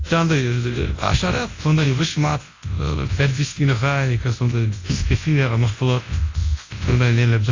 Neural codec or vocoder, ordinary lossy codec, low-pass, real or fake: codec, 24 kHz, 0.9 kbps, WavTokenizer, large speech release; AAC, 32 kbps; 7.2 kHz; fake